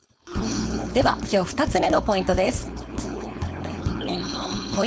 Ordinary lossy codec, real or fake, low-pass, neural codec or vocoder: none; fake; none; codec, 16 kHz, 4.8 kbps, FACodec